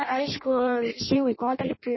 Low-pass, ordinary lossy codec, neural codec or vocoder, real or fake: 7.2 kHz; MP3, 24 kbps; codec, 16 kHz in and 24 kHz out, 0.6 kbps, FireRedTTS-2 codec; fake